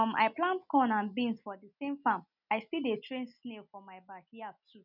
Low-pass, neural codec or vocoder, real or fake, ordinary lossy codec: 5.4 kHz; none; real; none